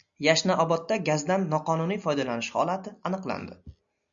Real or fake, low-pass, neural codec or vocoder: real; 7.2 kHz; none